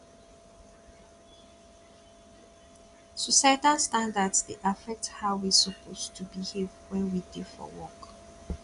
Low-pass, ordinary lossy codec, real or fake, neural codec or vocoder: 10.8 kHz; none; real; none